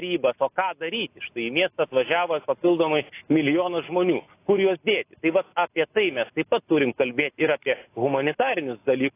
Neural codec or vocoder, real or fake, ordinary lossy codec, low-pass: none; real; AAC, 24 kbps; 3.6 kHz